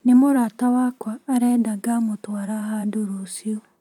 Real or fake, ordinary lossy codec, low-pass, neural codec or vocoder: fake; none; 19.8 kHz; vocoder, 44.1 kHz, 128 mel bands, Pupu-Vocoder